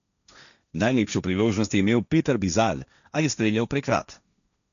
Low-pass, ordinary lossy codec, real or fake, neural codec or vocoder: 7.2 kHz; none; fake; codec, 16 kHz, 1.1 kbps, Voila-Tokenizer